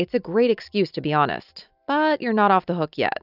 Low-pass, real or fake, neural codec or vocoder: 5.4 kHz; real; none